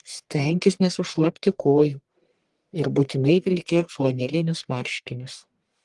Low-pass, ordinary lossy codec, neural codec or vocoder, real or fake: 10.8 kHz; Opus, 24 kbps; codec, 44.1 kHz, 1.7 kbps, Pupu-Codec; fake